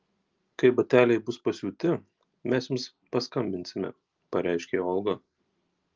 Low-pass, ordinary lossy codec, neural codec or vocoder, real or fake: 7.2 kHz; Opus, 32 kbps; none; real